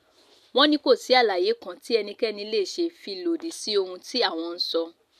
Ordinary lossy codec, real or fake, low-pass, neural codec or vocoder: none; real; 14.4 kHz; none